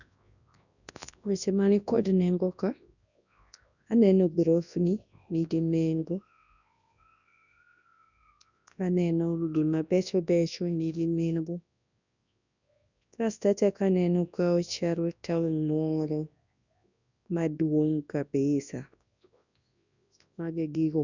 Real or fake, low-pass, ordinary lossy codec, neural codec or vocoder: fake; 7.2 kHz; none; codec, 24 kHz, 0.9 kbps, WavTokenizer, large speech release